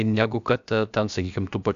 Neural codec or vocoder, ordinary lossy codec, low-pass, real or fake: codec, 16 kHz, about 1 kbps, DyCAST, with the encoder's durations; Opus, 64 kbps; 7.2 kHz; fake